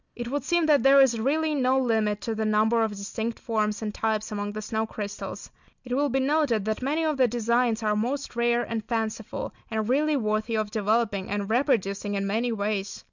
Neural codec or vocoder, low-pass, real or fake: none; 7.2 kHz; real